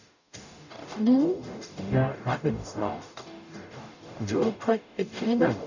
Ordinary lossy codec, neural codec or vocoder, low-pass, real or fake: none; codec, 44.1 kHz, 0.9 kbps, DAC; 7.2 kHz; fake